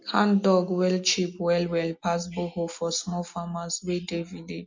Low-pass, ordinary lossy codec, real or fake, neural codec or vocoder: 7.2 kHz; MP3, 48 kbps; real; none